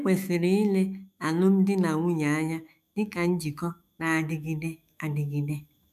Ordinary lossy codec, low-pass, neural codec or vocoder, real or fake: none; 14.4 kHz; autoencoder, 48 kHz, 128 numbers a frame, DAC-VAE, trained on Japanese speech; fake